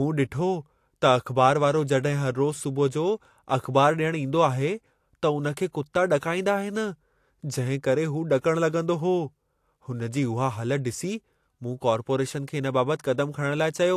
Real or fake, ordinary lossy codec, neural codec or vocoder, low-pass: real; AAC, 64 kbps; none; 14.4 kHz